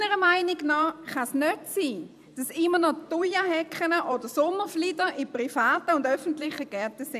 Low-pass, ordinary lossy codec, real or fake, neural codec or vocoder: 14.4 kHz; none; fake; vocoder, 44.1 kHz, 128 mel bands every 512 samples, BigVGAN v2